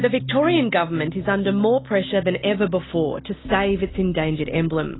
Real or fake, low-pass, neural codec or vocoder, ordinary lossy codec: real; 7.2 kHz; none; AAC, 16 kbps